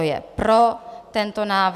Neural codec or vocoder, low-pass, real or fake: none; 14.4 kHz; real